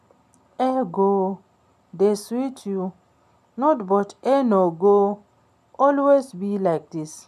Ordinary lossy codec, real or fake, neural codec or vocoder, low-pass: none; real; none; 14.4 kHz